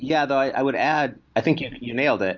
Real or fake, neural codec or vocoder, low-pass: real; none; 7.2 kHz